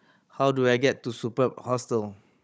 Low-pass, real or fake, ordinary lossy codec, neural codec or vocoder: none; fake; none; codec, 16 kHz, 16 kbps, FunCodec, trained on Chinese and English, 50 frames a second